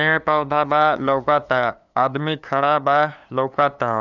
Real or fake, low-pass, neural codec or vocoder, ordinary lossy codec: fake; 7.2 kHz; codec, 16 kHz, 2 kbps, FunCodec, trained on LibriTTS, 25 frames a second; none